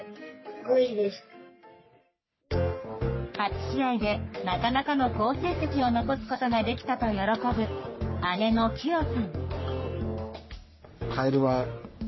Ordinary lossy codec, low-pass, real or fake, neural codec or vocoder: MP3, 24 kbps; 7.2 kHz; fake; codec, 44.1 kHz, 3.4 kbps, Pupu-Codec